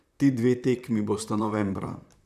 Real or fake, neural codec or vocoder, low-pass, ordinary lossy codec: fake; vocoder, 44.1 kHz, 128 mel bands, Pupu-Vocoder; 14.4 kHz; none